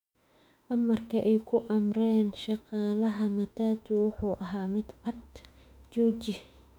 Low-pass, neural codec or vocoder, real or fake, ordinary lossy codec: 19.8 kHz; autoencoder, 48 kHz, 32 numbers a frame, DAC-VAE, trained on Japanese speech; fake; none